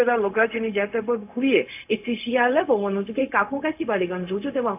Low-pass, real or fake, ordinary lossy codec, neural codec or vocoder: 3.6 kHz; fake; AAC, 32 kbps; codec, 16 kHz, 0.4 kbps, LongCat-Audio-Codec